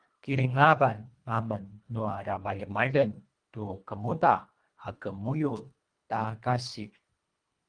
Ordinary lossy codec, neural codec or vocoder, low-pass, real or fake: Opus, 32 kbps; codec, 24 kHz, 1.5 kbps, HILCodec; 9.9 kHz; fake